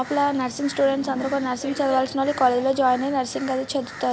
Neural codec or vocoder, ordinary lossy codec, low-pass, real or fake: none; none; none; real